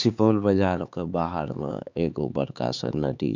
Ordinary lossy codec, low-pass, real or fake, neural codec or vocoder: none; 7.2 kHz; fake; codec, 16 kHz, 4 kbps, X-Codec, HuBERT features, trained on LibriSpeech